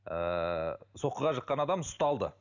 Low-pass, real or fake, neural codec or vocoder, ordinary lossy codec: 7.2 kHz; real; none; none